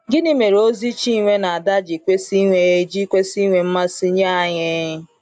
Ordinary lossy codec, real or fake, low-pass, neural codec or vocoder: AAC, 64 kbps; real; 9.9 kHz; none